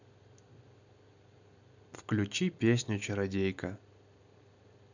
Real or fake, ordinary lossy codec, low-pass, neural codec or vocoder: real; none; 7.2 kHz; none